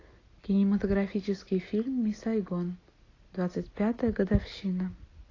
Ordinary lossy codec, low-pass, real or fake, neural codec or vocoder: AAC, 32 kbps; 7.2 kHz; real; none